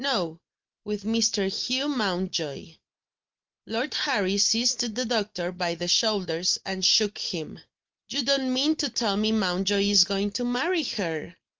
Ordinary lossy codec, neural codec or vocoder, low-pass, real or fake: Opus, 24 kbps; none; 7.2 kHz; real